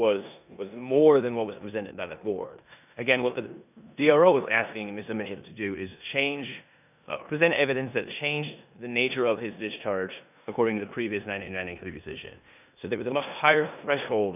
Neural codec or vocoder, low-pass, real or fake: codec, 16 kHz in and 24 kHz out, 0.9 kbps, LongCat-Audio-Codec, four codebook decoder; 3.6 kHz; fake